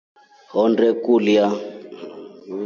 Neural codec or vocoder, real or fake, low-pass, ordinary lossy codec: none; real; 7.2 kHz; MP3, 48 kbps